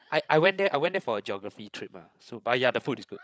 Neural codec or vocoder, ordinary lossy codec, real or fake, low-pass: codec, 16 kHz, 16 kbps, FreqCodec, larger model; none; fake; none